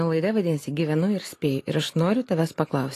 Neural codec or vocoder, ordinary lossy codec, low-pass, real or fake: none; AAC, 48 kbps; 14.4 kHz; real